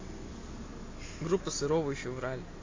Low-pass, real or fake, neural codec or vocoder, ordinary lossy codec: 7.2 kHz; real; none; AAC, 32 kbps